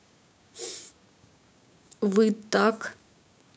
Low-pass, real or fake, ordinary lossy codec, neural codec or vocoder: none; fake; none; codec, 16 kHz, 6 kbps, DAC